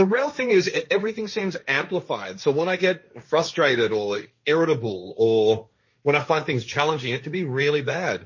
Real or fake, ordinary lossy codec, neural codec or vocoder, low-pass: fake; MP3, 32 kbps; codec, 16 kHz, 1.1 kbps, Voila-Tokenizer; 7.2 kHz